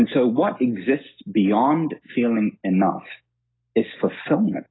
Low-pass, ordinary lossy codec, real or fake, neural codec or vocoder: 7.2 kHz; AAC, 16 kbps; real; none